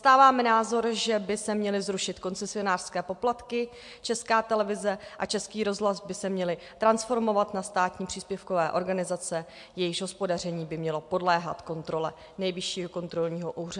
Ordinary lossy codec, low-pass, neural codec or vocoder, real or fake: MP3, 64 kbps; 10.8 kHz; none; real